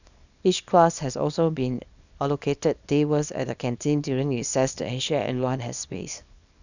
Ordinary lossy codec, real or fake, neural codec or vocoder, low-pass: none; fake; codec, 24 kHz, 0.9 kbps, WavTokenizer, small release; 7.2 kHz